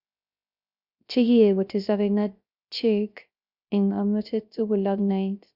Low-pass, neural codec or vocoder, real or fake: 5.4 kHz; codec, 16 kHz, 0.3 kbps, FocalCodec; fake